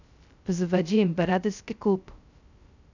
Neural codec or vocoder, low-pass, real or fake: codec, 16 kHz, 0.2 kbps, FocalCodec; 7.2 kHz; fake